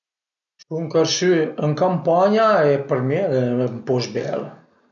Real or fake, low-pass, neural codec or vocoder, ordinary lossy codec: real; 7.2 kHz; none; none